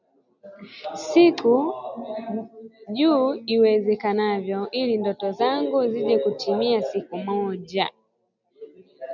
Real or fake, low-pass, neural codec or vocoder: real; 7.2 kHz; none